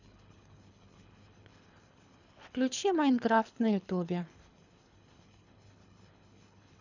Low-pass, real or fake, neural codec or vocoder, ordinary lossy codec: 7.2 kHz; fake; codec, 24 kHz, 3 kbps, HILCodec; none